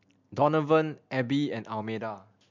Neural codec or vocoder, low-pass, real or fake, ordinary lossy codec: none; 7.2 kHz; real; MP3, 64 kbps